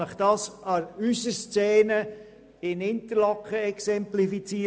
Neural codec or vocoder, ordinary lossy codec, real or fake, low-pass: none; none; real; none